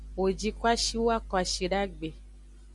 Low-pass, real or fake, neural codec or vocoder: 10.8 kHz; real; none